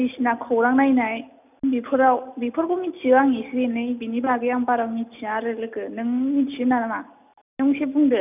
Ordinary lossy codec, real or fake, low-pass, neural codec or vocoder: none; real; 3.6 kHz; none